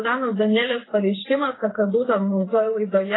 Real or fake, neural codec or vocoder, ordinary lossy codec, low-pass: fake; codec, 16 kHz, 4 kbps, FreqCodec, smaller model; AAC, 16 kbps; 7.2 kHz